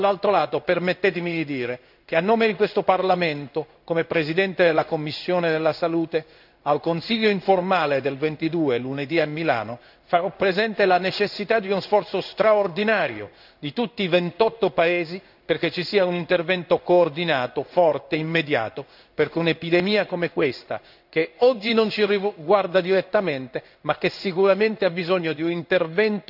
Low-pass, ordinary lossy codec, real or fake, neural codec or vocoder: 5.4 kHz; none; fake; codec, 16 kHz in and 24 kHz out, 1 kbps, XY-Tokenizer